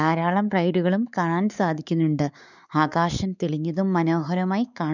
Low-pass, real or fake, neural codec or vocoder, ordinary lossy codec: 7.2 kHz; fake; codec, 16 kHz, 4 kbps, X-Codec, WavLM features, trained on Multilingual LibriSpeech; none